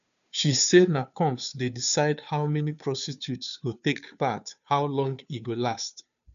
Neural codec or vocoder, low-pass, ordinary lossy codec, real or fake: codec, 16 kHz, 2 kbps, FunCodec, trained on Chinese and English, 25 frames a second; 7.2 kHz; none; fake